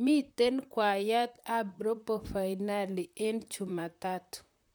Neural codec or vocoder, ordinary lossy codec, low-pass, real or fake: vocoder, 44.1 kHz, 128 mel bands, Pupu-Vocoder; none; none; fake